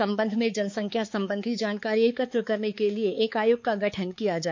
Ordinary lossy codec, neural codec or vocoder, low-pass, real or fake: MP3, 48 kbps; codec, 16 kHz, 4 kbps, X-Codec, HuBERT features, trained on balanced general audio; 7.2 kHz; fake